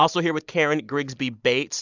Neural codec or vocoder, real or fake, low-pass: none; real; 7.2 kHz